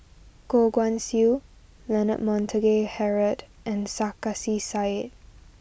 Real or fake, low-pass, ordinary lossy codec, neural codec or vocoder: real; none; none; none